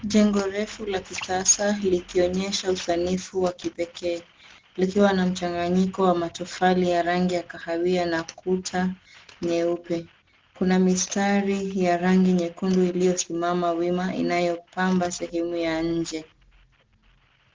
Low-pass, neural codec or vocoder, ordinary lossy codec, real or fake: 7.2 kHz; none; Opus, 16 kbps; real